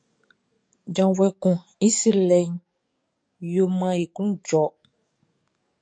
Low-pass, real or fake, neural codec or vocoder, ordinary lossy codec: 9.9 kHz; fake; codec, 44.1 kHz, 7.8 kbps, DAC; MP3, 64 kbps